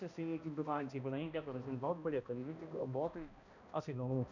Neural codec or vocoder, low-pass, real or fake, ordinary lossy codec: codec, 16 kHz, 1 kbps, X-Codec, HuBERT features, trained on balanced general audio; 7.2 kHz; fake; none